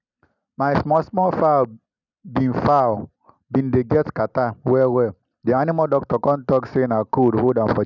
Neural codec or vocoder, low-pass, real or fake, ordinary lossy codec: none; 7.2 kHz; real; none